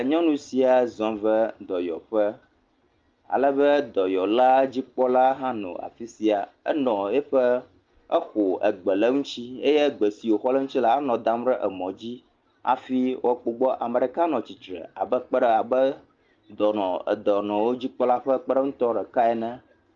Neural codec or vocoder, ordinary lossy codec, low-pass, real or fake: none; Opus, 32 kbps; 7.2 kHz; real